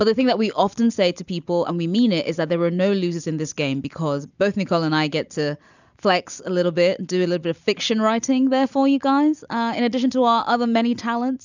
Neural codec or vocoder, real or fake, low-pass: none; real; 7.2 kHz